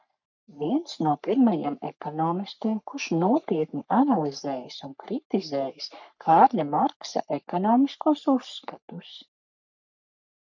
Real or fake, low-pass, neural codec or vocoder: fake; 7.2 kHz; codec, 44.1 kHz, 3.4 kbps, Pupu-Codec